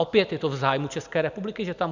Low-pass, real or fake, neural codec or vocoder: 7.2 kHz; real; none